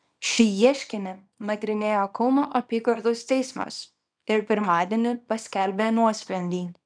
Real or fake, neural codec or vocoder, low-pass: fake; codec, 24 kHz, 0.9 kbps, WavTokenizer, small release; 9.9 kHz